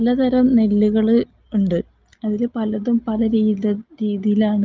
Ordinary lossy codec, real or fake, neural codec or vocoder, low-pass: Opus, 32 kbps; real; none; 7.2 kHz